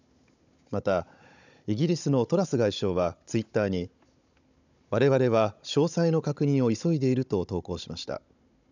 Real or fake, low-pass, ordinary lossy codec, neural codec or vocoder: fake; 7.2 kHz; none; codec, 16 kHz, 16 kbps, FunCodec, trained on Chinese and English, 50 frames a second